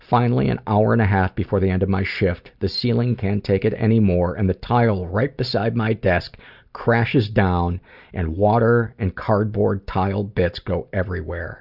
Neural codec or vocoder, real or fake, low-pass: none; real; 5.4 kHz